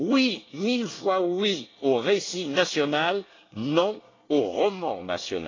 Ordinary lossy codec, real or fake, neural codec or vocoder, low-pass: AAC, 32 kbps; fake; codec, 24 kHz, 1 kbps, SNAC; 7.2 kHz